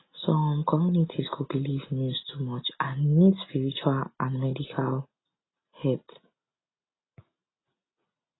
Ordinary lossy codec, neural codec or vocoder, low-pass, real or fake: AAC, 16 kbps; none; 7.2 kHz; real